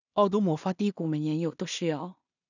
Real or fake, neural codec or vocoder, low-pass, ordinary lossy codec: fake; codec, 16 kHz in and 24 kHz out, 0.4 kbps, LongCat-Audio-Codec, two codebook decoder; 7.2 kHz; none